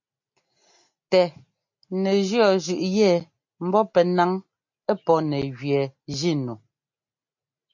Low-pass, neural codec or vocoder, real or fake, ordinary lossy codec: 7.2 kHz; none; real; MP3, 48 kbps